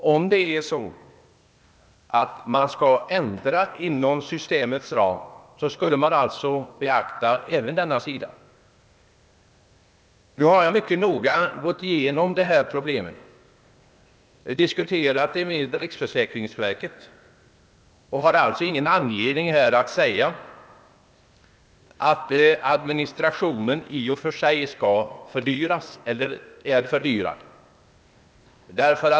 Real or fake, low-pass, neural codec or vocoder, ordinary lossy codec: fake; none; codec, 16 kHz, 0.8 kbps, ZipCodec; none